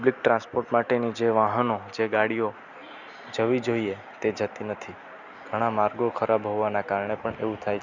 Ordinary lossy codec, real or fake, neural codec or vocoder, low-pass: none; real; none; 7.2 kHz